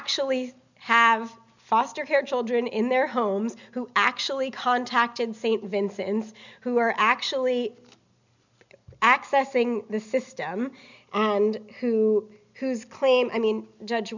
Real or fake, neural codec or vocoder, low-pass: real; none; 7.2 kHz